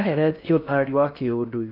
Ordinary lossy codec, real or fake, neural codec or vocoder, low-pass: none; fake; codec, 16 kHz in and 24 kHz out, 0.6 kbps, FocalCodec, streaming, 4096 codes; 5.4 kHz